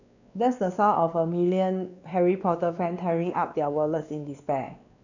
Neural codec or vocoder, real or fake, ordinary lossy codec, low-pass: codec, 16 kHz, 2 kbps, X-Codec, WavLM features, trained on Multilingual LibriSpeech; fake; none; 7.2 kHz